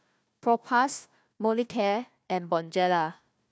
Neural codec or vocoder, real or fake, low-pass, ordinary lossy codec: codec, 16 kHz, 1 kbps, FunCodec, trained on Chinese and English, 50 frames a second; fake; none; none